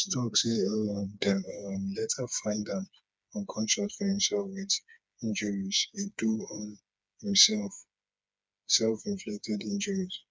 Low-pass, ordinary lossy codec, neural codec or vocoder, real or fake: none; none; codec, 16 kHz, 4 kbps, FreqCodec, smaller model; fake